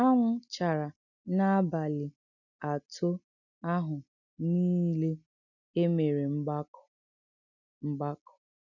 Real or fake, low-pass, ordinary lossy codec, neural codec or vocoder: real; 7.2 kHz; none; none